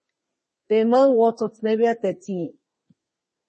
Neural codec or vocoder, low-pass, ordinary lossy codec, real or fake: codec, 32 kHz, 1.9 kbps, SNAC; 10.8 kHz; MP3, 32 kbps; fake